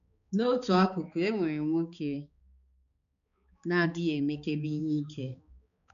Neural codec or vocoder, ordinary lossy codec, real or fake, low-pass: codec, 16 kHz, 4 kbps, X-Codec, HuBERT features, trained on balanced general audio; none; fake; 7.2 kHz